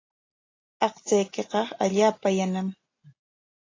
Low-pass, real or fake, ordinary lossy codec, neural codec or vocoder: 7.2 kHz; real; AAC, 32 kbps; none